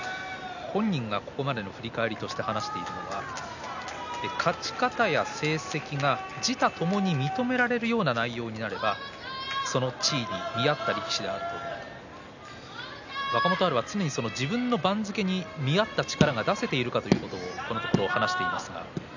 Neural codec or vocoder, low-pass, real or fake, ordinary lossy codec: none; 7.2 kHz; real; none